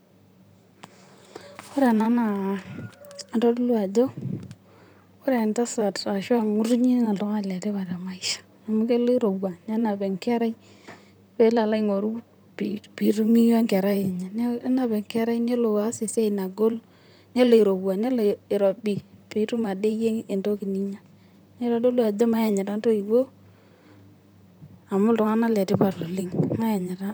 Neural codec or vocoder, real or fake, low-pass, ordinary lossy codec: vocoder, 44.1 kHz, 128 mel bands, Pupu-Vocoder; fake; none; none